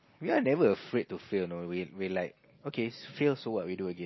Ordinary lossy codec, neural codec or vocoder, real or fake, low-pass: MP3, 24 kbps; none; real; 7.2 kHz